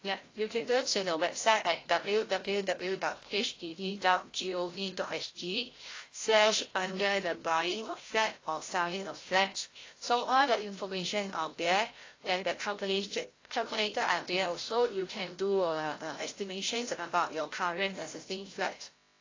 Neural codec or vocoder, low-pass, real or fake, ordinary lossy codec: codec, 16 kHz, 0.5 kbps, FreqCodec, larger model; 7.2 kHz; fake; AAC, 32 kbps